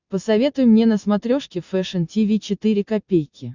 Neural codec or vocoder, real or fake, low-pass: none; real; 7.2 kHz